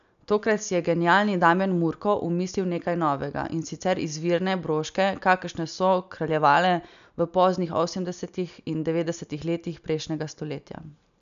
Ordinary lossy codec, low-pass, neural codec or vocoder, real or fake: none; 7.2 kHz; none; real